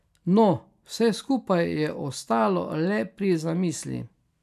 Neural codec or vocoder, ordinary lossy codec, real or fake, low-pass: none; none; real; 14.4 kHz